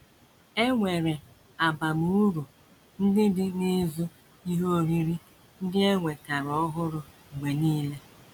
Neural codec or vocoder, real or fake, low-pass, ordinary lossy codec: none; real; 19.8 kHz; none